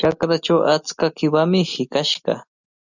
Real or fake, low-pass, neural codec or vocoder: real; 7.2 kHz; none